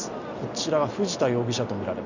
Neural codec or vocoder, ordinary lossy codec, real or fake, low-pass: none; none; real; 7.2 kHz